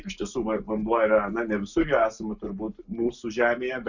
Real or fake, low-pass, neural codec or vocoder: real; 7.2 kHz; none